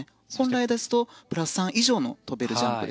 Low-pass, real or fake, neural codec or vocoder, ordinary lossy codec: none; real; none; none